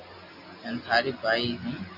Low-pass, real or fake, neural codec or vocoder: 5.4 kHz; real; none